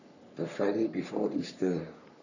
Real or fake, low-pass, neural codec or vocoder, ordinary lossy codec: fake; 7.2 kHz; codec, 44.1 kHz, 3.4 kbps, Pupu-Codec; none